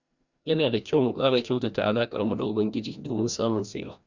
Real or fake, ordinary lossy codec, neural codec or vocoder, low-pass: fake; Opus, 64 kbps; codec, 16 kHz, 1 kbps, FreqCodec, larger model; 7.2 kHz